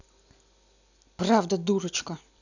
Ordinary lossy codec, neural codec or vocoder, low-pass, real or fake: none; none; 7.2 kHz; real